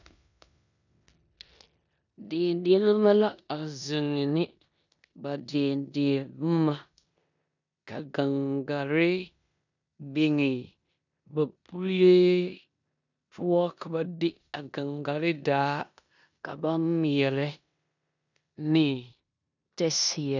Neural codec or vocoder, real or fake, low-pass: codec, 16 kHz in and 24 kHz out, 0.9 kbps, LongCat-Audio-Codec, four codebook decoder; fake; 7.2 kHz